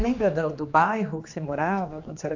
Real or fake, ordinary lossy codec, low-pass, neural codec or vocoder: fake; AAC, 48 kbps; 7.2 kHz; codec, 16 kHz, 2 kbps, X-Codec, HuBERT features, trained on general audio